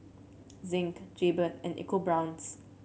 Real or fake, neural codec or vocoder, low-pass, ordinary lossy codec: real; none; none; none